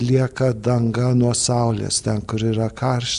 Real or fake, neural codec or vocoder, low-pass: real; none; 10.8 kHz